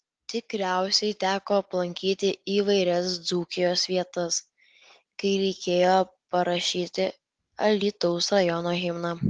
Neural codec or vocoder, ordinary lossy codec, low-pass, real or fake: none; Opus, 24 kbps; 9.9 kHz; real